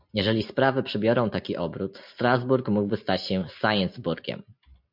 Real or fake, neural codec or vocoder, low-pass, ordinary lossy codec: real; none; 5.4 kHz; MP3, 48 kbps